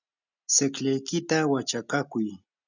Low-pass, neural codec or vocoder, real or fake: 7.2 kHz; none; real